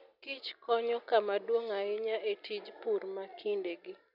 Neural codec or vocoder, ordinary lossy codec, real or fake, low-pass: none; none; real; 5.4 kHz